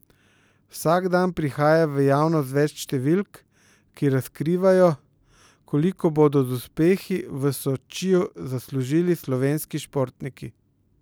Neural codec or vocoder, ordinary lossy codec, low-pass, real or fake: none; none; none; real